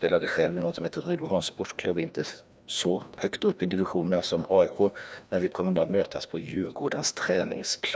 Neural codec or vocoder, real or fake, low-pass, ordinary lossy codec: codec, 16 kHz, 1 kbps, FreqCodec, larger model; fake; none; none